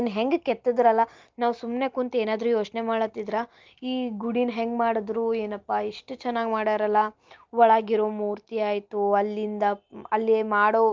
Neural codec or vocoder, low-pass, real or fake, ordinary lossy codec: none; 7.2 kHz; real; Opus, 24 kbps